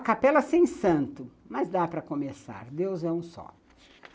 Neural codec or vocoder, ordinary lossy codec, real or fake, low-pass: none; none; real; none